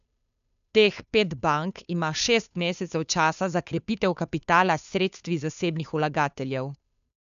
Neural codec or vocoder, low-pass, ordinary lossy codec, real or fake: codec, 16 kHz, 2 kbps, FunCodec, trained on Chinese and English, 25 frames a second; 7.2 kHz; none; fake